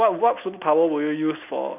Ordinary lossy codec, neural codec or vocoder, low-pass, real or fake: none; none; 3.6 kHz; real